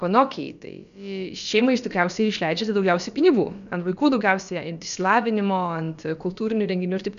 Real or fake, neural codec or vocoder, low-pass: fake; codec, 16 kHz, about 1 kbps, DyCAST, with the encoder's durations; 7.2 kHz